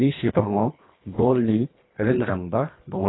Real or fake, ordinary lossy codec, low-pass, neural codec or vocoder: fake; AAC, 16 kbps; 7.2 kHz; codec, 24 kHz, 1.5 kbps, HILCodec